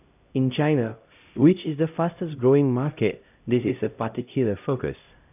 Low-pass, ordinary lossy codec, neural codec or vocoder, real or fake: 3.6 kHz; none; codec, 16 kHz, 0.5 kbps, X-Codec, HuBERT features, trained on LibriSpeech; fake